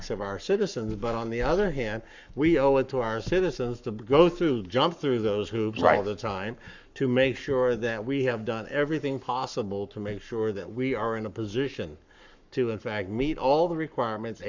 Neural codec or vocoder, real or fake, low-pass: codec, 44.1 kHz, 7.8 kbps, Pupu-Codec; fake; 7.2 kHz